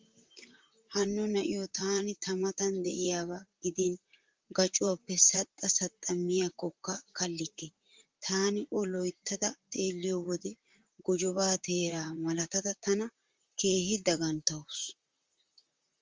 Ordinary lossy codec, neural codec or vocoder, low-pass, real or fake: Opus, 32 kbps; vocoder, 44.1 kHz, 128 mel bands, Pupu-Vocoder; 7.2 kHz; fake